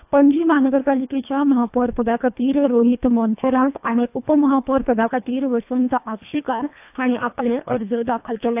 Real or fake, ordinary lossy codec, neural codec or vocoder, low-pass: fake; AAC, 32 kbps; codec, 24 kHz, 1.5 kbps, HILCodec; 3.6 kHz